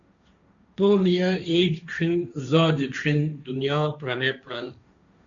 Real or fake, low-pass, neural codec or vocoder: fake; 7.2 kHz; codec, 16 kHz, 1.1 kbps, Voila-Tokenizer